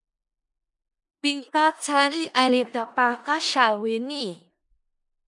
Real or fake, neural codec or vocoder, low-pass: fake; codec, 16 kHz in and 24 kHz out, 0.4 kbps, LongCat-Audio-Codec, four codebook decoder; 10.8 kHz